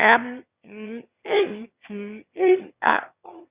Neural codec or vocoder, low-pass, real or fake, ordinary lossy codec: autoencoder, 22.05 kHz, a latent of 192 numbers a frame, VITS, trained on one speaker; 3.6 kHz; fake; Opus, 64 kbps